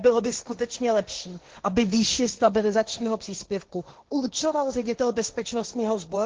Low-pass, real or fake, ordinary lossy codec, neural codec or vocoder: 7.2 kHz; fake; Opus, 16 kbps; codec, 16 kHz, 1.1 kbps, Voila-Tokenizer